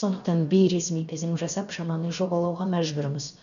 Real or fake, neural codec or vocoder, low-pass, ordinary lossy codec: fake; codec, 16 kHz, 0.7 kbps, FocalCodec; 7.2 kHz; none